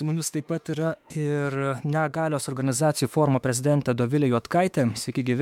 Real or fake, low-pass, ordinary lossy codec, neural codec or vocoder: fake; 19.8 kHz; MP3, 96 kbps; autoencoder, 48 kHz, 32 numbers a frame, DAC-VAE, trained on Japanese speech